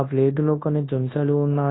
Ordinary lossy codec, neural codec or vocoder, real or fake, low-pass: AAC, 16 kbps; codec, 24 kHz, 0.9 kbps, WavTokenizer, large speech release; fake; 7.2 kHz